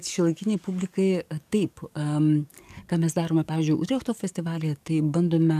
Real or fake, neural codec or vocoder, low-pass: fake; codec, 44.1 kHz, 7.8 kbps, DAC; 14.4 kHz